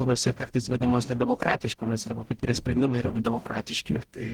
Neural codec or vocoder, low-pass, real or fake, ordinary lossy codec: codec, 44.1 kHz, 0.9 kbps, DAC; 19.8 kHz; fake; Opus, 16 kbps